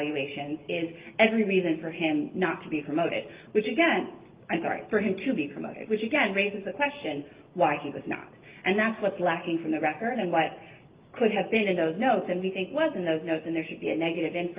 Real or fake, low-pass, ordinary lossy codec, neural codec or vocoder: real; 3.6 kHz; Opus, 32 kbps; none